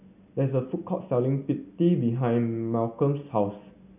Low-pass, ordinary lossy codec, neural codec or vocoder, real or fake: 3.6 kHz; none; none; real